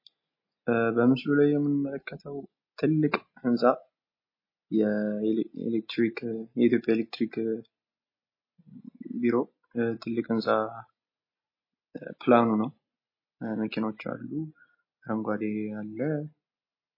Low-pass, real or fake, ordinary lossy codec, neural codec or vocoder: 5.4 kHz; real; MP3, 24 kbps; none